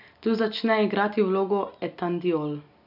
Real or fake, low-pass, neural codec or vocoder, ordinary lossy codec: real; 5.4 kHz; none; none